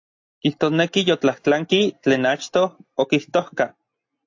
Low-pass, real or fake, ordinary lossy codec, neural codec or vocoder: 7.2 kHz; real; AAC, 48 kbps; none